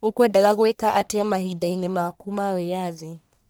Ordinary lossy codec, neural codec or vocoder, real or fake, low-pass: none; codec, 44.1 kHz, 1.7 kbps, Pupu-Codec; fake; none